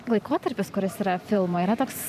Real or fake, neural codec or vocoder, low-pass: fake; vocoder, 44.1 kHz, 128 mel bands every 512 samples, BigVGAN v2; 14.4 kHz